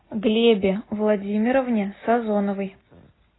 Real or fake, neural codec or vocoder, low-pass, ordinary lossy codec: real; none; 7.2 kHz; AAC, 16 kbps